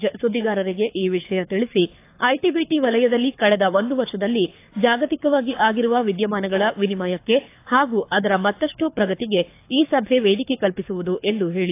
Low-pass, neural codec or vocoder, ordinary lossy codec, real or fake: 3.6 kHz; codec, 24 kHz, 6 kbps, HILCodec; AAC, 24 kbps; fake